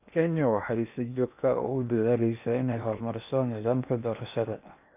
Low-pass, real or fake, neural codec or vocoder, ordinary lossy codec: 3.6 kHz; fake; codec, 16 kHz in and 24 kHz out, 0.8 kbps, FocalCodec, streaming, 65536 codes; none